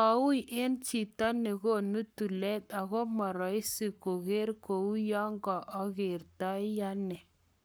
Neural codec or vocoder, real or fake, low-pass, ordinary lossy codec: codec, 44.1 kHz, 7.8 kbps, Pupu-Codec; fake; none; none